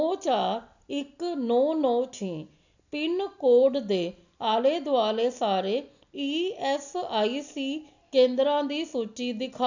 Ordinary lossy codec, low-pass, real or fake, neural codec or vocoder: none; 7.2 kHz; real; none